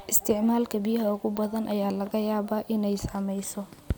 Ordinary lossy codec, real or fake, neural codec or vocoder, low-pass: none; real; none; none